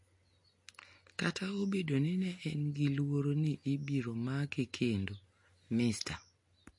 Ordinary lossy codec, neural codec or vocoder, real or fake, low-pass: MP3, 48 kbps; none; real; 10.8 kHz